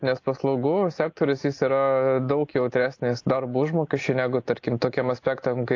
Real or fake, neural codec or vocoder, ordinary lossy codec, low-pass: real; none; AAC, 48 kbps; 7.2 kHz